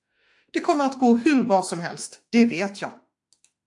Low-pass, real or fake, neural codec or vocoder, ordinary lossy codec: 10.8 kHz; fake; autoencoder, 48 kHz, 32 numbers a frame, DAC-VAE, trained on Japanese speech; AAC, 64 kbps